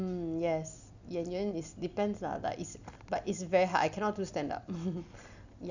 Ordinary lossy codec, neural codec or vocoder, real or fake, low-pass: none; none; real; 7.2 kHz